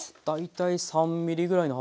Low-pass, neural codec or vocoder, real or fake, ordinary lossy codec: none; none; real; none